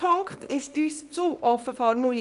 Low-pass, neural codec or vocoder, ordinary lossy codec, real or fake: 10.8 kHz; codec, 24 kHz, 0.9 kbps, WavTokenizer, small release; none; fake